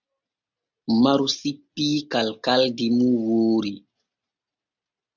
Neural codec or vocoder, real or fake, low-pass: none; real; 7.2 kHz